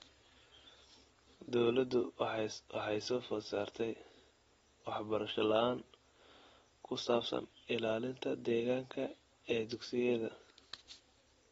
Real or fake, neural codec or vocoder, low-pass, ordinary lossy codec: real; none; 19.8 kHz; AAC, 24 kbps